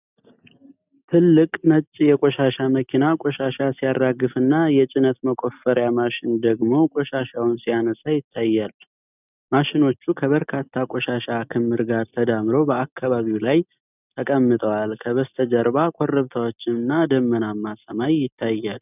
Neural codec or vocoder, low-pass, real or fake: none; 3.6 kHz; real